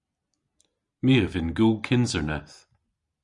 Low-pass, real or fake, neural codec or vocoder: 10.8 kHz; real; none